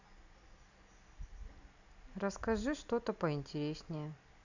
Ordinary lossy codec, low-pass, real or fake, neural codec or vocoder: none; 7.2 kHz; real; none